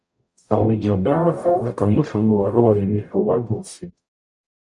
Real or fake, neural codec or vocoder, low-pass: fake; codec, 44.1 kHz, 0.9 kbps, DAC; 10.8 kHz